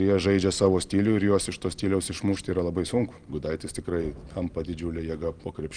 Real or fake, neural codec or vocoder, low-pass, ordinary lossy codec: real; none; 9.9 kHz; Opus, 24 kbps